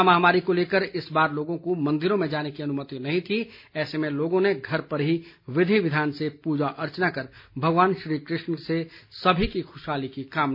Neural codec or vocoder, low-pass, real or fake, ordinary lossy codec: none; 5.4 kHz; real; MP3, 32 kbps